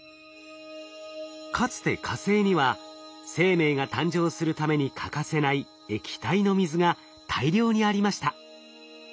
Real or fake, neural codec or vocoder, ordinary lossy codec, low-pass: real; none; none; none